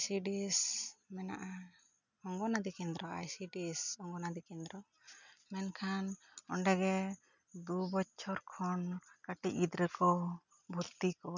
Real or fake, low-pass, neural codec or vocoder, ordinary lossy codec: real; 7.2 kHz; none; none